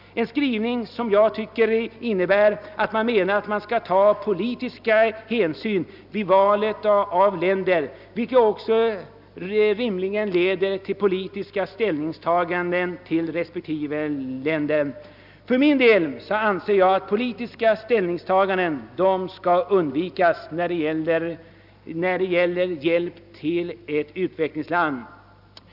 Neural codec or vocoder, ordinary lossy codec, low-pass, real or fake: none; none; 5.4 kHz; real